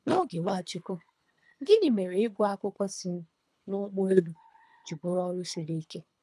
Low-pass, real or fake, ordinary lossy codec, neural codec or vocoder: none; fake; none; codec, 24 kHz, 3 kbps, HILCodec